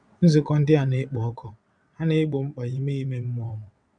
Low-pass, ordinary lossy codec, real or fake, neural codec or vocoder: 9.9 kHz; none; fake; vocoder, 22.05 kHz, 80 mel bands, WaveNeXt